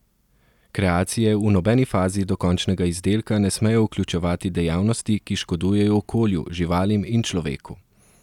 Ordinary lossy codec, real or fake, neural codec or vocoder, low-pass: none; real; none; 19.8 kHz